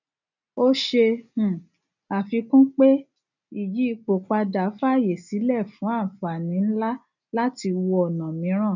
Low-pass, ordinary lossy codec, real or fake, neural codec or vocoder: 7.2 kHz; none; real; none